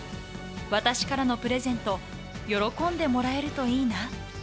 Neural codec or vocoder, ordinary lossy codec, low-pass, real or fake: none; none; none; real